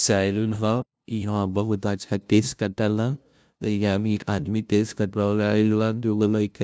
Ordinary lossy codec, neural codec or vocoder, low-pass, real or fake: none; codec, 16 kHz, 0.5 kbps, FunCodec, trained on LibriTTS, 25 frames a second; none; fake